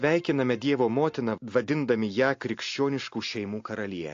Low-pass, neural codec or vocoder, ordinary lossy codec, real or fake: 7.2 kHz; none; AAC, 48 kbps; real